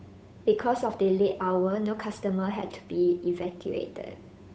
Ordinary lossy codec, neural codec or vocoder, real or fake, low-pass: none; codec, 16 kHz, 8 kbps, FunCodec, trained on Chinese and English, 25 frames a second; fake; none